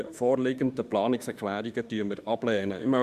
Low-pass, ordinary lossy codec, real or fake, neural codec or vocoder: 14.4 kHz; Opus, 64 kbps; fake; autoencoder, 48 kHz, 32 numbers a frame, DAC-VAE, trained on Japanese speech